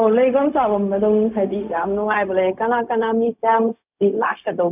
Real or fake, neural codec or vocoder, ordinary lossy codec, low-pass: fake; codec, 16 kHz, 0.4 kbps, LongCat-Audio-Codec; none; 3.6 kHz